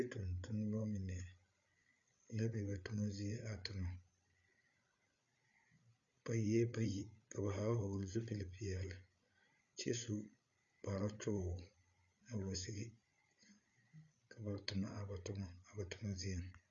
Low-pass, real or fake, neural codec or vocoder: 7.2 kHz; fake; codec, 16 kHz, 8 kbps, FreqCodec, larger model